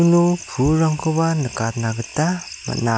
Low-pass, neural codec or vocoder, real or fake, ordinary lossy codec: none; none; real; none